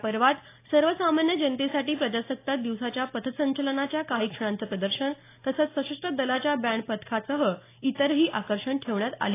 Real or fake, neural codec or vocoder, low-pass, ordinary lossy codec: real; none; 3.6 kHz; AAC, 24 kbps